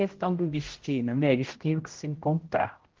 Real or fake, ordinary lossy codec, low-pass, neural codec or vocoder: fake; Opus, 16 kbps; 7.2 kHz; codec, 16 kHz, 0.5 kbps, X-Codec, HuBERT features, trained on general audio